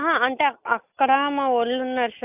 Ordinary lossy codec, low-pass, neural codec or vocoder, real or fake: AAC, 32 kbps; 3.6 kHz; none; real